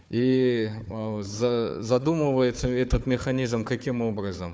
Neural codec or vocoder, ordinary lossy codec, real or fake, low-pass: codec, 16 kHz, 4 kbps, FunCodec, trained on Chinese and English, 50 frames a second; none; fake; none